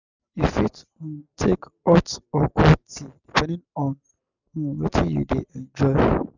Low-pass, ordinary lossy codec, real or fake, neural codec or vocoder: 7.2 kHz; none; real; none